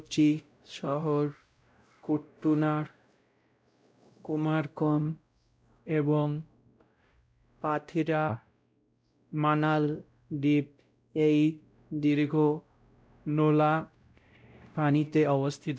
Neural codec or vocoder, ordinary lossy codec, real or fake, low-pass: codec, 16 kHz, 0.5 kbps, X-Codec, WavLM features, trained on Multilingual LibriSpeech; none; fake; none